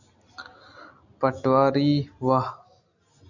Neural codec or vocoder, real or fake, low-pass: none; real; 7.2 kHz